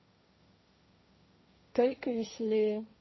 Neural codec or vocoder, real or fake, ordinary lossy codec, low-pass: codec, 16 kHz, 1.1 kbps, Voila-Tokenizer; fake; MP3, 24 kbps; 7.2 kHz